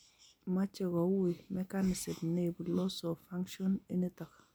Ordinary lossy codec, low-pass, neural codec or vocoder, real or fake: none; none; none; real